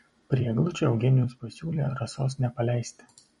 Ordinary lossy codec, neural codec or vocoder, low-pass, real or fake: MP3, 48 kbps; vocoder, 44.1 kHz, 128 mel bands every 256 samples, BigVGAN v2; 19.8 kHz; fake